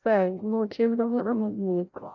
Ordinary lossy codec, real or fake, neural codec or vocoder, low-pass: none; fake; codec, 16 kHz, 0.5 kbps, FreqCodec, larger model; 7.2 kHz